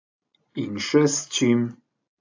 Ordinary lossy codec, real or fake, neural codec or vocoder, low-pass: AAC, 48 kbps; real; none; 7.2 kHz